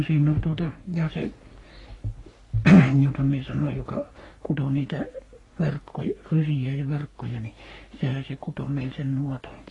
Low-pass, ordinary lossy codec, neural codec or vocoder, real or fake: 10.8 kHz; AAC, 32 kbps; codec, 44.1 kHz, 3.4 kbps, Pupu-Codec; fake